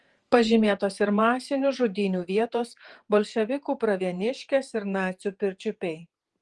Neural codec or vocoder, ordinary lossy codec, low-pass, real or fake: vocoder, 48 kHz, 128 mel bands, Vocos; Opus, 24 kbps; 10.8 kHz; fake